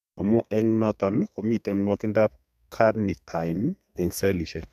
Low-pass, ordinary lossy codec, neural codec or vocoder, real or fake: 14.4 kHz; none; codec, 32 kHz, 1.9 kbps, SNAC; fake